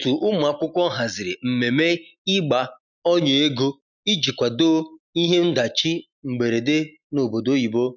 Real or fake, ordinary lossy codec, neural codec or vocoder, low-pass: real; none; none; 7.2 kHz